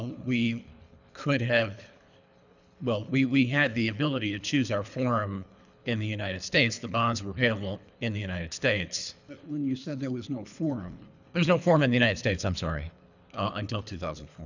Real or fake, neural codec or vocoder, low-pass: fake; codec, 24 kHz, 3 kbps, HILCodec; 7.2 kHz